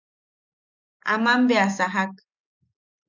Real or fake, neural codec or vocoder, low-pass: real; none; 7.2 kHz